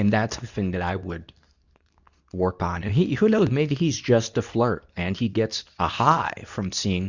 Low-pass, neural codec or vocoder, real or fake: 7.2 kHz; codec, 24 kHz, 0.9 kbps, WavTokenizer, medium speech release version 2; fake